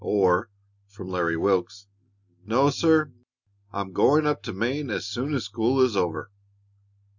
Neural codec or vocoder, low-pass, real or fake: none; 7.2 kHz; real